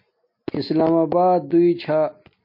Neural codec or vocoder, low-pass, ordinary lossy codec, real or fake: none; 5.4 kHz; MP3, 32 kbps; real